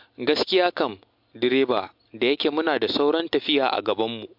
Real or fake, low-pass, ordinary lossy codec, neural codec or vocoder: real; 5.4 kHz; MP3, 48 kbps; none